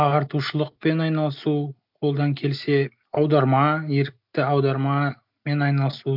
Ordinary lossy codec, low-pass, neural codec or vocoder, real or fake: none; 5.4 kHz; none; real